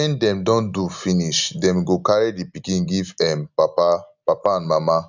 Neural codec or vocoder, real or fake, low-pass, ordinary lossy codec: none; real; 7.2 kHz; none